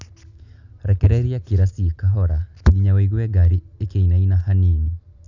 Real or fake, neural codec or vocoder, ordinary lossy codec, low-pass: real; none; none; 7.2 kHz